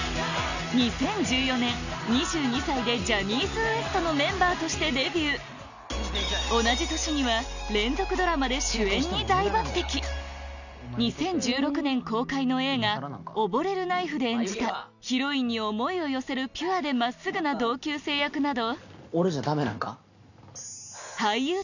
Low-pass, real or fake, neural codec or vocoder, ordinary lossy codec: 7.2 kHz; real; none; none